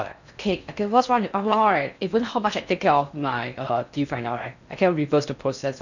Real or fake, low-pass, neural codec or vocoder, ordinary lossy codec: fake; 7.2 kHz; codec, 16 kHz in and 24 kHz out, 0.6 kbps, FocalCodec, streaming, 4096 codes; none